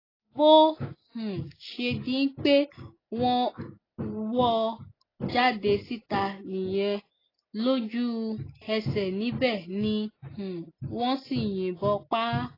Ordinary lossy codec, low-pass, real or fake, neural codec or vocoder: AAC, 24 kbps; 5.4 kHz; real; none